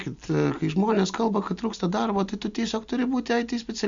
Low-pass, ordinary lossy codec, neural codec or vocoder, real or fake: 7.2 kHz; Opus, 64 kbps; none; real